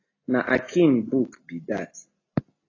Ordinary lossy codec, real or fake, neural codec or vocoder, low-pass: AAC, 32 kbps; real; none; 7.2 kHz